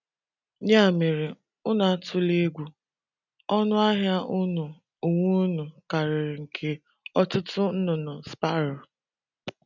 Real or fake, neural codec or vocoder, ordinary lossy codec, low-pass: real; none; none; 7.2 kHz